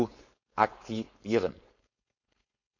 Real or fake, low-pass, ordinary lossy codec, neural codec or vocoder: fake; 7.2 kHz; none; codec, 16 kHz, 4.8 kbps, FACodec